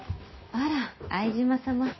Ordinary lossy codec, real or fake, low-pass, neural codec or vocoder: MP3, 24 kbps; real; 7.2 kHz; none